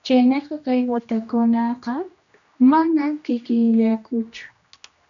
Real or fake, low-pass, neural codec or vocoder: fake; 7.2 kHz; codec, 16 kHz, 1 kbps, X-Codec, HuBERT features, trained on general audio